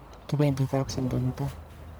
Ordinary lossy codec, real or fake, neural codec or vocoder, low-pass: none; fake; codec, 44.1 kHz, 1.7 kbps, Pupu-Codec; none